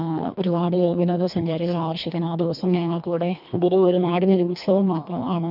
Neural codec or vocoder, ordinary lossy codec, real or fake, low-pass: codec, 24 kHz, 1.5 kbps, HILCodec; none; fake; 5.4 kHz